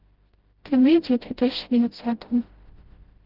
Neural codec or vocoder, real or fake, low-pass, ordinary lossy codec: codec, 16 kHz, 0.5 kbps, FreqCodec, smaller model; fake; 5.4 kHz; Opus, 16 kbps